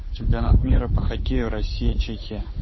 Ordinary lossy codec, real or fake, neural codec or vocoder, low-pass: MP3, 24 kbps; fake; codec, 16 kHz, 8 kbps, FunCodec, trained on Chinese and English, 25 frames a second; 7.2 kHz